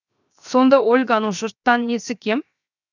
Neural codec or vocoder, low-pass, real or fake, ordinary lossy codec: codec, 16 kHz, 0.7 kbps, FocalCodec; 7.2 kHz; fake; none